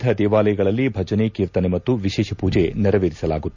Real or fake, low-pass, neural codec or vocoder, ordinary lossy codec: real; 7.2 kHz; none; none